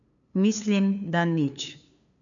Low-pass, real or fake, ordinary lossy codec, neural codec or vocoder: 7.2 kHz; fake; none; codec, 16 kHz, 2 kbps, FunCodec, trained on LibriTTS, 25 frames a second